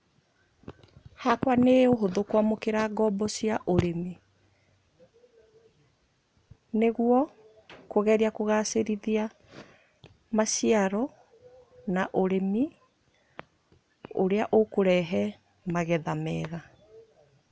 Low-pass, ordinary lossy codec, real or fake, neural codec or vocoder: none; none; real; none